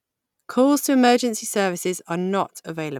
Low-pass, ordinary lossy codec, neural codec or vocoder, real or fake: 19.8 kHz; none; none; real